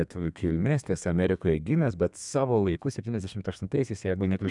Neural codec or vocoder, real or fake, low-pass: codec, 32 kHz, 1.9 kbps, SNAC; fake; 10.8 kHz